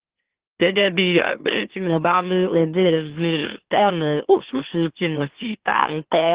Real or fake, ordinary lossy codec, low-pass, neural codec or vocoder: fake; Opus, 16 kbps; 3.6 kHz; autoencoder, 44.1 kHz, a latent of 192 numbers a frame, MeloTTS